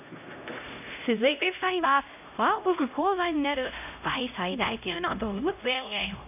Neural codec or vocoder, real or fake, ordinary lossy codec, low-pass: codec, 16 kHz, 0.5 kbps, X-Codec, HuBERT features, trained on LibriSpeech; fake; none; 3.6 kHz